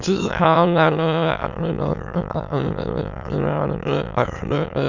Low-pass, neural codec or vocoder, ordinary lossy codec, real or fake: 7.2 kHz; autoencoder, 22.05 kHz, a latent of 192 numbers a frame, VITS, trained on many speakers; none; fake